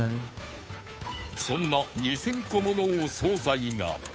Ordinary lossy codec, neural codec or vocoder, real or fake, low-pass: none; codec, 16 kHz, 2 kbps, FunCodec, trained on Chinese and English, 25 frames a second; fake; none